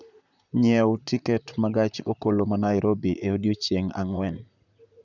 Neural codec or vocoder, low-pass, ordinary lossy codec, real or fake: vocoder, 44.1 kHz, 128 mel bands, Pupu-Vocoder; 7.2 kHz; none; fake